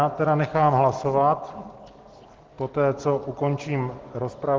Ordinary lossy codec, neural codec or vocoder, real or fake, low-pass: Opus, 16 kbps; none; real; 7.2 kHz